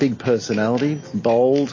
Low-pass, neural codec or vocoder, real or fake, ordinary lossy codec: 7.2 kHz; none; real; MP3, 32 kbps